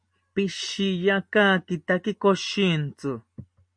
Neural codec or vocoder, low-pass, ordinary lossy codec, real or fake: none; 9.9 kHz; MP3, 48 kbps; real